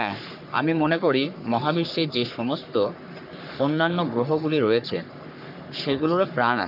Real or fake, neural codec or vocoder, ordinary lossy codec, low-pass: fake; codec, 44.1 kHz, 3.4 kbps, Pupu-Codec; none; 5.4 kHz